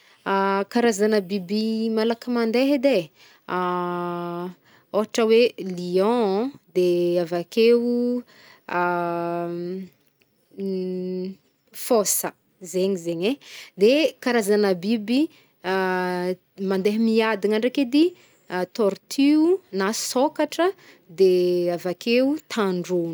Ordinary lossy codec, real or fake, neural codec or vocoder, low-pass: none; real; none; none